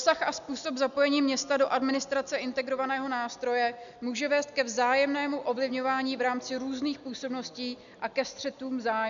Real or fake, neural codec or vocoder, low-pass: real; none; 7.2 kHz